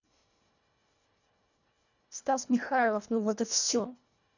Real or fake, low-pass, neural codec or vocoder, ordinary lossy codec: fake; 7.2 kHz; codec, 24 kHz, 1.5 kbps, HILCodec; none